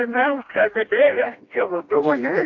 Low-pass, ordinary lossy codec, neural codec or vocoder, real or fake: 7.2 kHz; AAC, 48 kbps; codec, 16 kHz, 1 kbps, FreqCodec, smaller model; fake